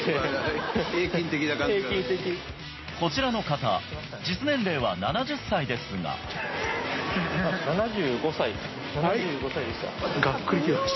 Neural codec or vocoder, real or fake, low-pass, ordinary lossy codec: none; real; 7.2 kHz; MP3, 24 kbps